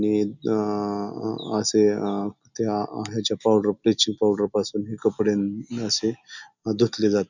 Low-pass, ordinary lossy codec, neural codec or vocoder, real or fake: 7.2 kHz; none; none; real